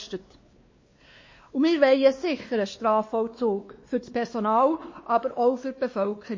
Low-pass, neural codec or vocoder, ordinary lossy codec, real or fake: 7.2 kHz; codec, 16 kHz, 2 kbps, X-Codec, WavLM features, trained on Multilingual LibriSpeech; MP3, 32 kbps; fake